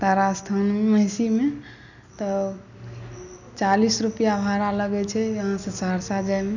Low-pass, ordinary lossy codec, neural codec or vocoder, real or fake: 7.2 kHz; none; none; real